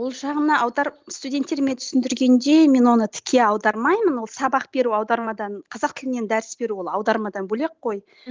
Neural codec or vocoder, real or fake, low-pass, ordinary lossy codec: none; real; 7.2 kHz; Opus, 32 kbps